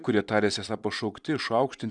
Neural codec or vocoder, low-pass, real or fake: vocoder, 44.1 kHz, 128 mel bands every 256 samples, BigVGAN v2; 10.8 kHz; fake